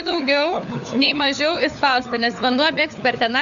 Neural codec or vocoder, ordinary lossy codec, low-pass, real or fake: codec, 16 kHz, 4 kbps, FunCodec, trained on LibriTTS, 50 frames a second; AAC, 96 kbps; 7.2 kHz; fake